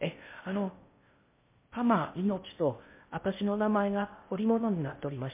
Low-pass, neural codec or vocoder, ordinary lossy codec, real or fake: 3.6 kHz; codec, 16 kHz in and 24 kHz out, 0.6 kbps, FocalCodec, streaming, 4096 codes; MP3, 24 kbps; fake